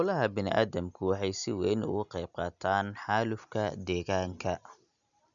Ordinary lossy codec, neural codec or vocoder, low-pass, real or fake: none; none; 7.2 kHz; real